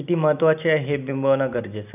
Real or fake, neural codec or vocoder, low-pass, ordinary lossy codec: real; none; 3.6 kHz; none